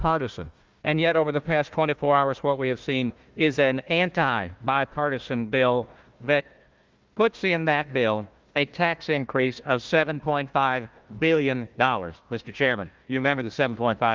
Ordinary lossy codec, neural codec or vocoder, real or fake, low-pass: Opus, 32 kbps; codec, 16 kHz, 1 kbps, FunCodec, trained on Chinese and English, 50 frames a second; fake; 7.2 kHz